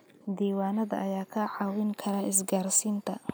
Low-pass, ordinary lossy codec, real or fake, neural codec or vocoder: none; none; real; none